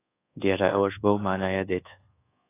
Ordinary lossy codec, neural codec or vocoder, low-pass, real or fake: AAC, 16 kbps; codec, 24 kHz, 1.2 kbps, DualCodec; 3.6 kHz; fake